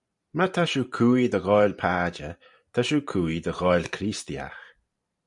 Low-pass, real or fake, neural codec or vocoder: 10.8 kHz; fake; vocoder, 44.1 kHz, 128 mel bands every 512 samples, BigVGAN v2